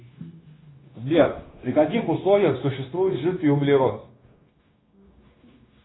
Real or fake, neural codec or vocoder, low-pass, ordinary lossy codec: fake; codec, 16 kHz, 0.9 kbps, LongCat-Audio-Codec; 7.2 kHz; AAC, 16 kbps